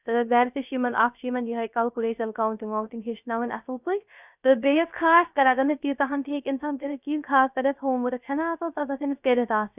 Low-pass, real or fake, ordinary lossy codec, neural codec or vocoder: 3.6 kHz; fake; none; codec, 16 kHz, 0.3 kbps, FocalCodec